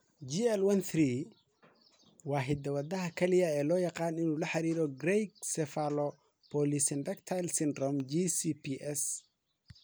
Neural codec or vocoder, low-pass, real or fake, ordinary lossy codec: none; none; real; none